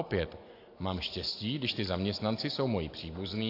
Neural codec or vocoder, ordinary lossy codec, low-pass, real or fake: none; AAC, 32 kbps; 5.4 kHz; real